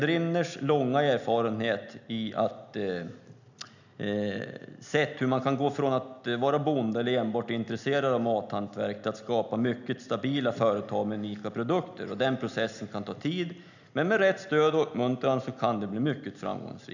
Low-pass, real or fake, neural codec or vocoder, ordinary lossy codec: 7.2 kHz; real; none; none